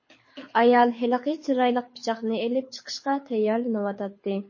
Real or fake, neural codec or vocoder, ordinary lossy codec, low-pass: fake; codec, 24 kHz, 6 kbps, HILCodec; MP3, 32 kbps; 7.2 kHz